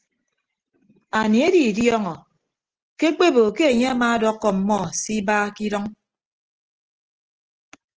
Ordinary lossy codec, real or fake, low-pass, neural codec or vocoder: Opus, 16 kbps; real; 7.2 kHz; none